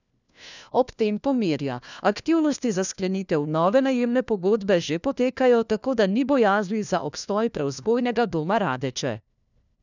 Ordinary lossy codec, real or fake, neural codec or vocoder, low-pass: none; fake; codec, 16 kHz, 1 kbps, FunCodec, trained on LibriTTS, 50 frames a second; 7.2 kHz